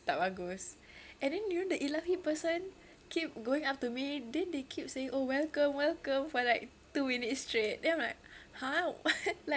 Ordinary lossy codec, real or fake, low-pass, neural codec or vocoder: none; real; none; none